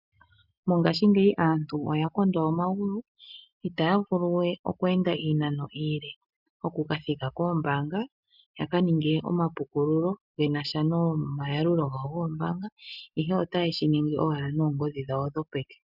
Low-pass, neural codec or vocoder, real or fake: 5.4 kHz; none; real